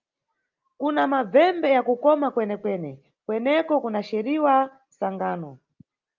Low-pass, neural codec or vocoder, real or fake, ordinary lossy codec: 7.2 kHz; none; real; Opus, 24 kbps